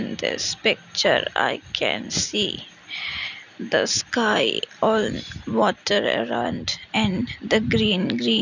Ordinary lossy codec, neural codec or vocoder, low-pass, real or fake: none; none; 7.2 kHz; real